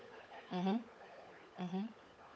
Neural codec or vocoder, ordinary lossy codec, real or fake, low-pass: codec, 16 kHz, 16 kbps, FunCodec, trained on LibriTTS, 50 frames a second; none; fake; none